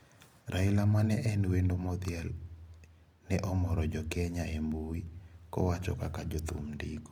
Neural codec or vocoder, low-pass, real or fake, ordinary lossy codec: none; 19.8 kHz; real; MP3, 96 kbps